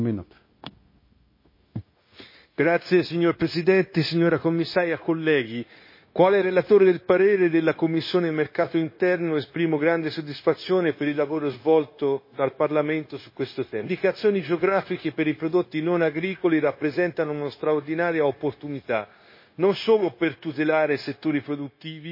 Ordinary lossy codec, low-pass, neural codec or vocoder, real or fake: MP3, 24 kbps; 5.4 kHz; codec, 16 kHz, 0.9 kbps, LongCat-Audio-Codec; fake